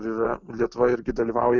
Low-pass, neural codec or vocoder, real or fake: 7.2 kHz; none; real